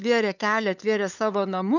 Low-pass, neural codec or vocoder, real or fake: 7.2 kHz; codec, 16 kHz, 8 kbps, FunCodec, trained on LibriTTS, 25 frames a second; fake